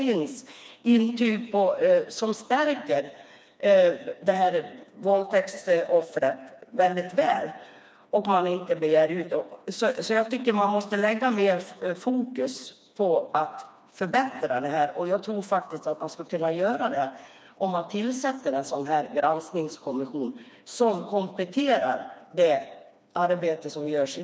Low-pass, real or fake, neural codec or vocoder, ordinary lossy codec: none; fake; codec, 16 kHz, 2 kbps, FreqCodec, smaller model; none